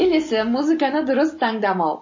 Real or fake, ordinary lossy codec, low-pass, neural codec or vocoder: real; MP3, 32 kbps; 7.2 kHz; none